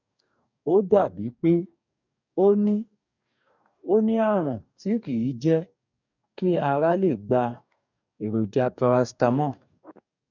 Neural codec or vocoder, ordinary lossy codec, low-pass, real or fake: codec, 44.1 kHz, 2.6 kbps, DAC; none; 7.2 kHz; fake